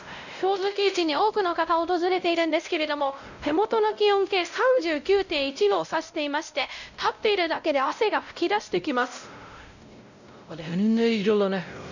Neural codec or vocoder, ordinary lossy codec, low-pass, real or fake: codec, 16 kHz, 0.5 kbps, X-Codec, WavLM features, trained on Multilingual LibriSpeech; none; 7.2 kHz; fake